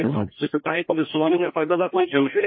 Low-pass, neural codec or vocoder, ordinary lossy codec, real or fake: 7.2 kHz; codec, 16 kHz, 1 kbps, FreqCodec, larger model; MP3, 24 kbps; fake